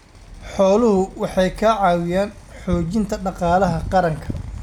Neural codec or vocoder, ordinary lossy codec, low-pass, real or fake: none; none; 19.8 kHz; real